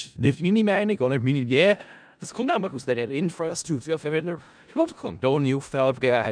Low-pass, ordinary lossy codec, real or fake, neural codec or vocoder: 9.9 kHz; none; fake; codec, 16 kHz in and 24 kHz out, 0.4 kbps, LongCat-Audio-Codec, four codebook decoder